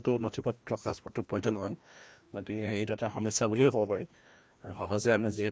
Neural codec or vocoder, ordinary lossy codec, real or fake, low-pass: codec, 16 kHz, 1 kbps, FreqCodec, larger model; none; fake; none